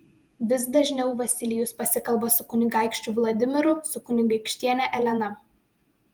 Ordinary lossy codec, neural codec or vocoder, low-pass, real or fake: Opus, 32 kbps; vocoder, 48 kHz, 128 mel bands, Vocos; 19.8 kHz; fake